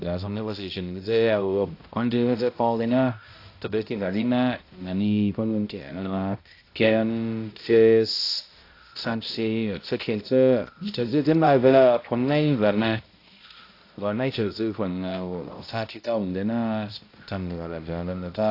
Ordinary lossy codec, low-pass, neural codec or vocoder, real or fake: AAC, 32 kbps; 5.4 kHz; codec, 16 kHz, 0.5 kbps, X-Codec, HuBERT features, trained on balanced general audio; fake